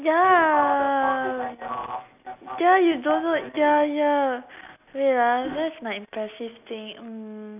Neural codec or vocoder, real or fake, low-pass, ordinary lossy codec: none; real; 3.6 kHz; none